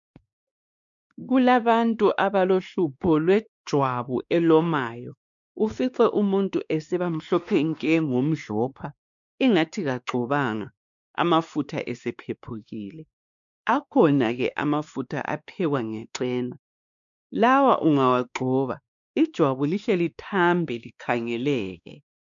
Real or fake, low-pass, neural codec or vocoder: fake; 7.2 kHz; codec, 16 kHz, 2 kbps, X-Codec, WavLM features, trained on Multilingual LibriSpeech